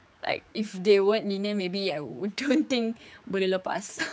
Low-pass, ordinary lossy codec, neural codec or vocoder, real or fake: none; none; codec, 16 kHz, 4 kbps, X-Codec, HuBERT features, trained on general audio; fake